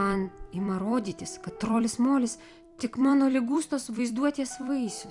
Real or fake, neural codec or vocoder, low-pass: fake; vocoder, 44.1 kHz, 128 mel bands every 256 samples, BigVGAN v2; 10.8 kHz